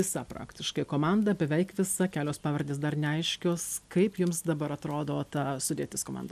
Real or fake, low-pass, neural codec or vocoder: real; 14.4 kHz; none